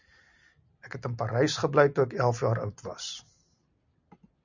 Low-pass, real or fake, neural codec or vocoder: 7.2 kHz; real; none